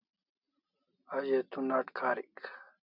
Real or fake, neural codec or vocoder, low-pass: real; none; 5.4 kHz